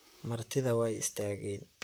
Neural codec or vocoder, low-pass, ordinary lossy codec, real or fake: vocoder, 44.1 kHz, 128 mel bands, Pupu-Vocoder; none; none; fake